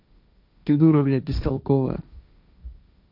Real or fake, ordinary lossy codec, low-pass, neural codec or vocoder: fake; none; 5.4 kHz; codec, 16 kHz, 1.1 kbps, Voila-Tokenizer